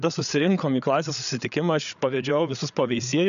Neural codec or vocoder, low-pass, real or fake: codec, 16 kHz, 8 kbps, FreqCodec, larger model; 7.2 kHz; fake